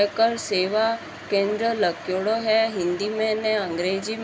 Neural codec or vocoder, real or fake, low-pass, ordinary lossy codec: none; real; none; none